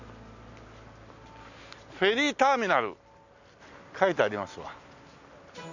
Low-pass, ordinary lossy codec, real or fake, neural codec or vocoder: 7.2 kHz; none; real; none